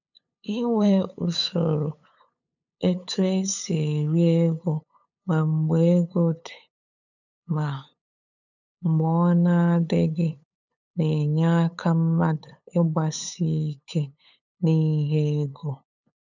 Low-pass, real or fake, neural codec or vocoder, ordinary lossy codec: 7.2 kHz; fake; codec, 16 kHz, 8 kbps, FunCodec, trained on LibriTTS, 25 frames a second; none